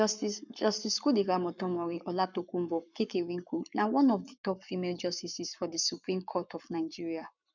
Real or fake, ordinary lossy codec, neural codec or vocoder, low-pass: fake; none; codec, 44.1 kHz, 7.8 kbps, Pupu-Codec; 7.2 kHz